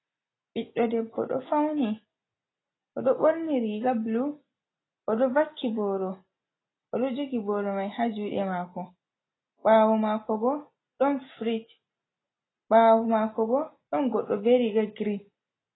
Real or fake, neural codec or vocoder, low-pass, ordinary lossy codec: real; none; 7.2 kHz; AAC, 16 kbps